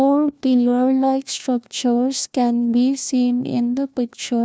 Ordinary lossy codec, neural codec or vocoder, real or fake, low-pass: none; codec, 16 kHz, 1 kbps, FunCodec, trained on LibriTTS, 50 frames a second; fake; none